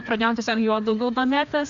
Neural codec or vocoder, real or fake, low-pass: codec, 16 kHz, 2 kbps, FreqCodec, larger model; fake; 7.2 kHz